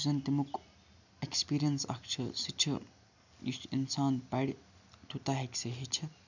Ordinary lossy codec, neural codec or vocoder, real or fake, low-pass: none; none; real; 7.2 kHz